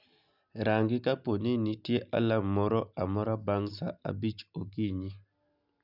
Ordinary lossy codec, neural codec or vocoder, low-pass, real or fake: none; none; 5.4 kHz; real